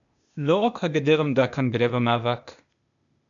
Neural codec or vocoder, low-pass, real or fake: codec, 16 kHz, 0.8 kbps, ZipCodec; 7.2 kHz; fake